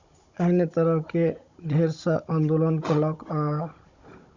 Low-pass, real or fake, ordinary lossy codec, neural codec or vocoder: 7.2 kHz; fake; Opus, 64 kbps; codec, 16 kHz, 16 kbps, FunCodec, trained on Chinese and English, 50 frames a second